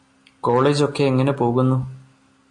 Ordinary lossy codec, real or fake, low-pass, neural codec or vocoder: MP3, 48 kbps; real; 10.8 kHz; none